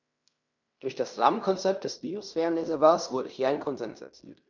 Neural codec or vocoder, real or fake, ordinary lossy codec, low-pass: codec, 16 kHz in and 24 kHz out, 0.9 kbps, LongCat-Audio-Codec, fine tuned four codebook decoder; fake; none; 7.2 kHz